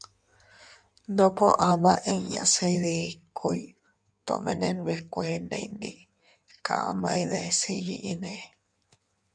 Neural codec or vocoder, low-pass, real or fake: codec, 16 kHz in and 24 kHz out, 1.1 kbps, FireRedTTS-2 codec; 9.9 kHz; fake